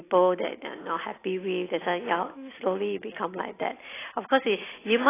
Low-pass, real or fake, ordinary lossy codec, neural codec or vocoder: 3.6 kHz; real; AAC, 16 kbps; none